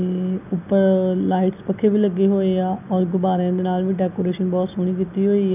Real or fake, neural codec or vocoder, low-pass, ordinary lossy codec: real; none; 3.6 kHz; none